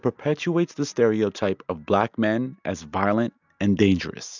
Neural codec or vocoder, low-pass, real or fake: none; 7.2 kHz; real